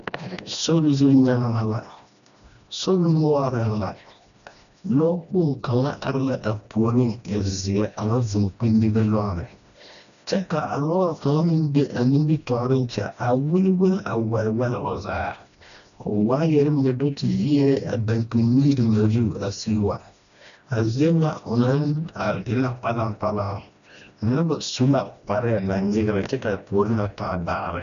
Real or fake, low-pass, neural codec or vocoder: fake; 7.2 kHz; codec, 16 kHz, 1 kbps, FreqCodec, smaller model